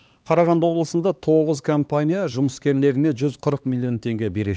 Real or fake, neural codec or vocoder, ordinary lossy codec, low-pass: fake; codec, 16 kHz, 2 kbps, X-Codec, HuBERT features, trained on LibriSpeech; none; none